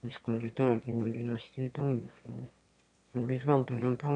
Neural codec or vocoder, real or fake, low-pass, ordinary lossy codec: autoencoder, 22.05 kHz, a latent of 192 numbers a frame, VITS, trained on one speaker; fake; 9.9 kHz; none